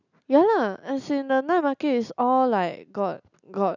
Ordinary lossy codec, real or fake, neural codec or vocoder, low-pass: none; real; none; 7.2 kHz